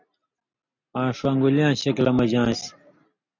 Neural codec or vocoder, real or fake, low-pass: none; real; 7.2 kHz